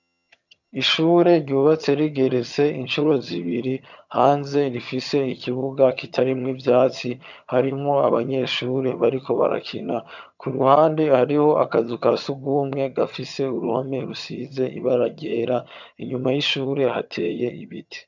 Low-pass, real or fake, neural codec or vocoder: 7.2 kHz; fake; vocoder, 22.05 kHz, 80 mel bands, HiFi-GAN